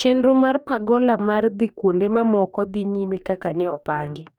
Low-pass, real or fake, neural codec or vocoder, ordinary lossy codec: 19.8 kHz; fake; codec, 44.1 kHz, 2.6 kbps, DAC; none